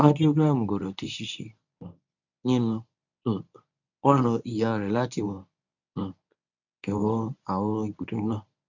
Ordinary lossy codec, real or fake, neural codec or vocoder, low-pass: none; fake; codec, 24 kHz, 0.9 kbps, WavTokenizer, medium speech release version 1; 7.2 kHz